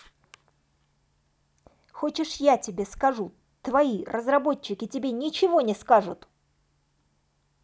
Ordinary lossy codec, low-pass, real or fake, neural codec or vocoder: none; none; real; none